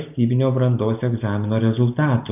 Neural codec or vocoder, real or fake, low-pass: none; real; 3.6 kHz